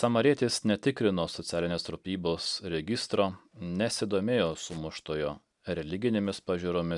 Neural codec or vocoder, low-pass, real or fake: none; 10.8 kHz; real